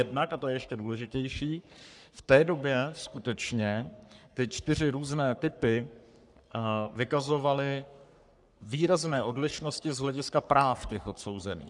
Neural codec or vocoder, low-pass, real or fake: codec, 44.1 kHz, 3.4 kbps, Pupu-Codec; 10.8 kHz; fake